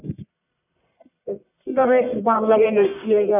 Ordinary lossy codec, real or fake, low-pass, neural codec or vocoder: none; fake; 3.6 kHz; codec, 44.1 kHz, 1.7 kbps, Pupu-Codec